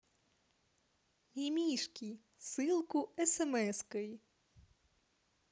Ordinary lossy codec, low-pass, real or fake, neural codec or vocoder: none; none; real; none